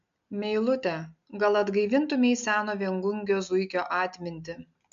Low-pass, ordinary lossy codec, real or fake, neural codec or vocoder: 7.2 kHz; AAC, 96 kbps; real; none